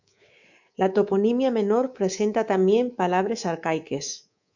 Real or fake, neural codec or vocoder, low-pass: fake; codec, 24 kHz, 3.1 kbps, DualCodec; 7.2 kHz